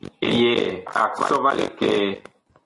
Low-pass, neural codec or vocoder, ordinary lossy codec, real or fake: 10.8 kHz; none; MP3, 48 kbps; real